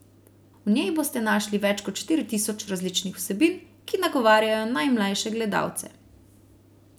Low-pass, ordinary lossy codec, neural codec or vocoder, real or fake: none; none; none; real